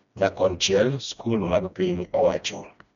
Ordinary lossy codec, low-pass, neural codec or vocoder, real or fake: none; 7.2 kHz; codec, 16 kHz, 1 kbps, FreqCodec, smaller model; fake